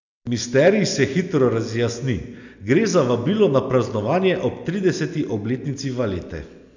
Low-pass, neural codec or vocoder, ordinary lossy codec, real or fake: 7.2 kHz; none; none; real